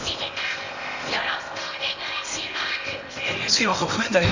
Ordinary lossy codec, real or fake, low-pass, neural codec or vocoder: none; fake; 7.2 kHz; codec, 16 kHz in and 24 kHz out, 0.8 kbps, FocalCodec, streaming, 65536 codes